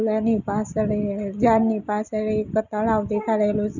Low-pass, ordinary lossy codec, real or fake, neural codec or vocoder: 7.2 kHz; none; real; none